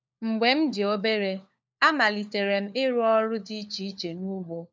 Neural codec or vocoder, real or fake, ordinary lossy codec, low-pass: codec, 16 kHz, 4 kbps, FunCodec, trained on LibriTTS, 50 frames a second; fake; none; none